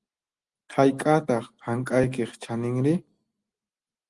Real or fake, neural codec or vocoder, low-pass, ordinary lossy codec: real; none; 10.8 kHz; Opus, 24 kbps